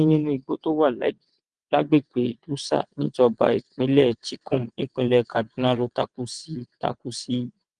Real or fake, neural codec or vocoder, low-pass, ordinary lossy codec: fake; vocoder, 22.05 kHz, 80 mel bands, WaveNeXt; 9.9 kHz; Opus, 24 kbps